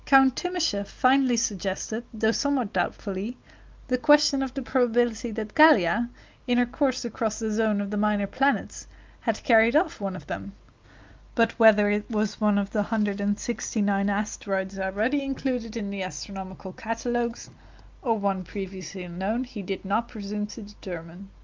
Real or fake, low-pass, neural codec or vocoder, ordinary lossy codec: real; 7.2 kHz; none; Opus, 24 kbps